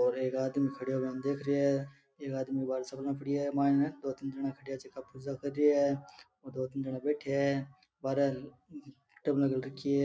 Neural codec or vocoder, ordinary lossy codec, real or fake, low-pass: none; none; real; none